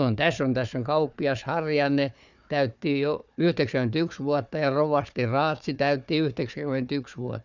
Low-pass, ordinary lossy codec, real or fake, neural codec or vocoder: 7.2 kHz; none; fake; codec, 16 kHz, 4 kbps, FunCodec, trained on Chinese and English, 50 frames a second